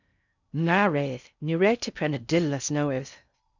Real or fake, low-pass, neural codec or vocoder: fake; 7.2 kHz; codec, 16 kHz in and 24 kHz out, 0.6 kbps, FocalCodec, streaming, 2048 codes